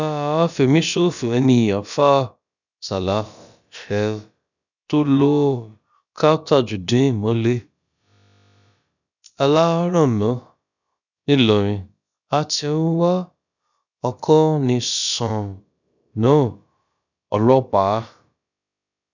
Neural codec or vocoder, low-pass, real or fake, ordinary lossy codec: codec, 16 kHz, about 1 kbps, DyCAST, with the encoder's durations; 7.2 kHz; fake; none